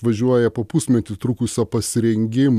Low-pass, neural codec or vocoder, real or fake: 14.4 kHz; none; real